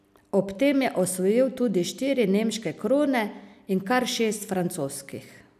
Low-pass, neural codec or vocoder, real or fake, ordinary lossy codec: 14.4 kHz; none; real; none